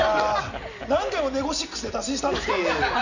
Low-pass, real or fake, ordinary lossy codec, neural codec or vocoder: 7.2 kHz; real; none; none